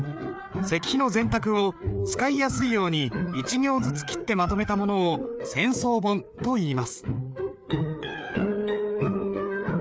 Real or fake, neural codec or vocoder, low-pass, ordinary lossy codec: fake; codec, 16 kHz, 4 kbps, FreqCodec, larger model; none; none